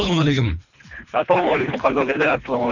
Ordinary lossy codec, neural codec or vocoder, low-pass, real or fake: none; codec, 24 kHz, 3 kbps, HILCodec; 7.2 kHz; fake